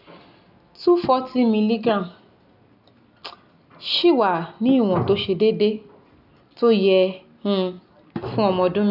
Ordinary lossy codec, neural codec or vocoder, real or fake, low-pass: none; none; real; 5.4 kHz